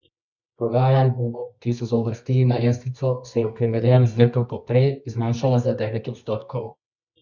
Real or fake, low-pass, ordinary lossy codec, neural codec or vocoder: fake; 7.2 kHz; none; codec, 24 kHz, 0.9 kbps, WavTokenizer, medium music audio release